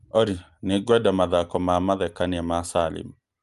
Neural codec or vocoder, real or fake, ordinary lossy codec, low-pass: none; real; Opus, 32 kbps; 10.8 kHz